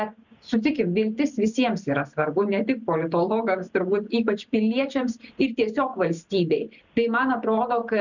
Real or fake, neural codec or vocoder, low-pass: real; none; 7.2 kHz